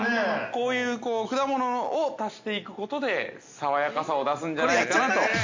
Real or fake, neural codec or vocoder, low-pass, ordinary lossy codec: real; none; 7.2 kHz; MP3, 64 kbps